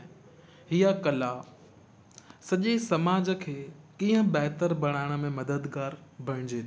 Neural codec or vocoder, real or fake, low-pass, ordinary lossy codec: none; real; none; none